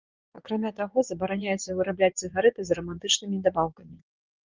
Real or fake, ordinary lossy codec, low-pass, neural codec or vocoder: fake; Opus, 32 kbps; 7.2 kHz; vocoder, 24 kHz, 100 mel bands, Vocos